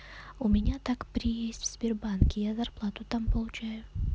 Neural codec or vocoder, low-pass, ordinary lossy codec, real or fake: none; none; none; real